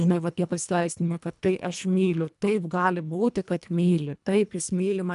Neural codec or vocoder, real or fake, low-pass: codec, 24 kHz, 1.5 kbps, HILCodec; fake; 10.8 kHz